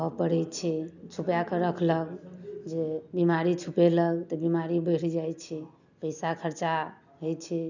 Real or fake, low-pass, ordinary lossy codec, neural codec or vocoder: real; 7.2 kHz; none; none